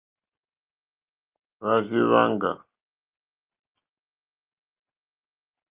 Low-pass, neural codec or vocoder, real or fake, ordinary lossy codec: 3.6 kHz; none; real; Opus, 32 kbps